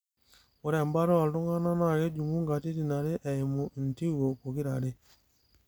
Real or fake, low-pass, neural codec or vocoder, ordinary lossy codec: real; none; none; none